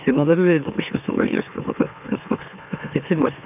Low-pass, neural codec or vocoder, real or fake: 3.6 kHz; autoencoder, 44.1 kHz, a latent of 192 numbers a frame, MeloTTS; fake